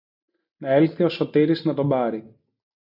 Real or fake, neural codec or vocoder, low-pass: real; none; 5.4 kHz